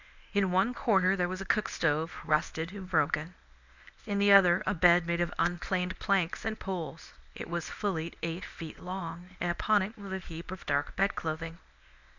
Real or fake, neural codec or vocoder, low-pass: fake; codec, 24 kHz, 0.9 kbps, WavTokenizer, medium speech release version 1; 7.2 kHz